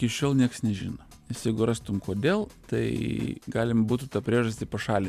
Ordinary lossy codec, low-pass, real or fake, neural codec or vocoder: AAC, 96 kbps; 14.4 kHz; real; none